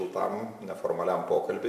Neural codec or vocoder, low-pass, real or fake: none; 14.4 kHz; real